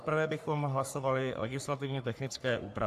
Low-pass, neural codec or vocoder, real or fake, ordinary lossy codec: 14.4 kHz; codec, 44.1 kHz, 3.4 kbps, Pupu-Codec; fake; AAC, 96 kbps